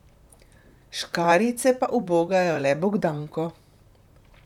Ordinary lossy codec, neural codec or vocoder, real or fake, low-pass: none; vocoder, 44.1 kHz, 128 mel bands, Pupu-Vocoder; fake; 19.8 kHz